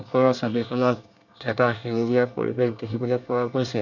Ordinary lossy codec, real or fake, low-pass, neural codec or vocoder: none; fake; 7.2 kHz; codec, 24 kHz, 1 kbps, SNAC